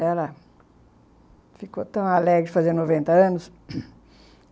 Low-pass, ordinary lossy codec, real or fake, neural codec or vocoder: none; none; real; none